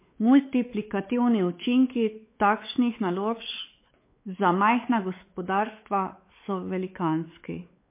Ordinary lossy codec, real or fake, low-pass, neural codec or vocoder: MP3, 24 kbps; fake; 3.6 kHz; codec, 16 kHz, 4 kbps, X-Codec, WavLM features, trained on Multilingual LibriSpeech